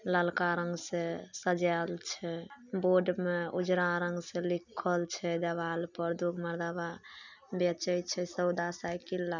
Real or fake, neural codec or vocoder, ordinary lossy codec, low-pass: real; none; none; 7.2 kHz